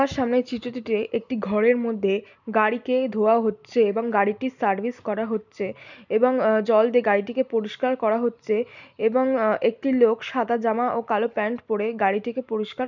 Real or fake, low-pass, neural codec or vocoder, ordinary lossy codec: real; 7.2 kHz; none; none